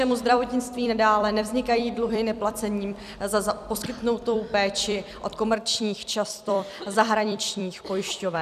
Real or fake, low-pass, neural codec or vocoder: fake; 14.4 kHz; vocoder, 44.1 kHz, 128 mel bands every 256 samples, BigVGAN v2